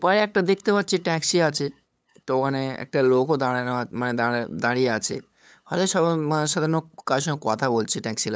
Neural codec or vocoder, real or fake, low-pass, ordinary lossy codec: codec, 16 kHz, 8 kbps, FunCodec, trained on LibriTTS, 25 frames a second; fake; none; none